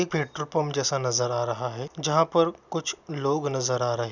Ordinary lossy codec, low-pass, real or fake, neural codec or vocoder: none; 7.2 kHz; real; none